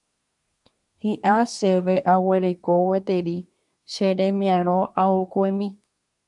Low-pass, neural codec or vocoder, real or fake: 10.8 kHz; codec, 24 kHz, 1 kbps, SNAC; fake